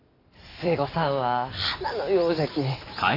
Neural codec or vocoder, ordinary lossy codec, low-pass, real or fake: none; AAC, 24 kbps; 5.4 kHz; real